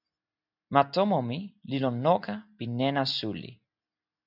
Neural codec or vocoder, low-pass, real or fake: none; 5.4 kHz; real